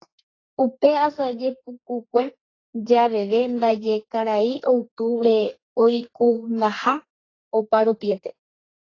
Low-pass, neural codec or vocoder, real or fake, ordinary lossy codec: 7.2 kHz; codec, 32 kHz, 1.9 kbps, SNAC; fake; AAC, 32 kbps